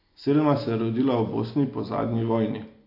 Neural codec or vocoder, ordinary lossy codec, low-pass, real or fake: none; AAC, 32 kbps; 5.4 kHz; real